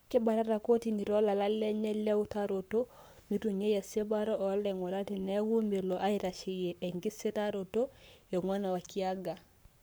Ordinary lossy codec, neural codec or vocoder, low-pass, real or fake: none; codec, 44.1 kHz, 7.8 kbps, Pupu-Codec; none; fake